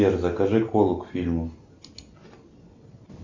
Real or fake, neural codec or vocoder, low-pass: real; none; 7.2 kHz